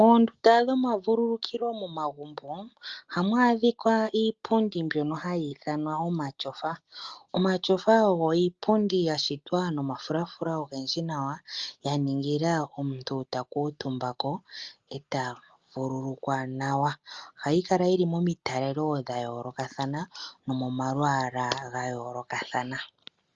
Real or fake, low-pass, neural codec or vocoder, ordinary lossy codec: real; 7.2 kHz; none; Opus, 32 kbps